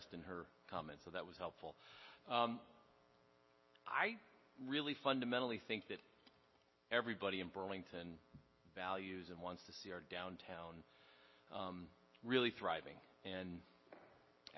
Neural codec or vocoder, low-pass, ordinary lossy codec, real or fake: none; 7.2 kHz; MP3, 24 kbps; real